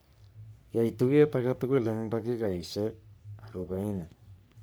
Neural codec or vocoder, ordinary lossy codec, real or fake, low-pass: codec, 44.1 kHz, 3.4 kbps, Pupu-Codec; none; fake; none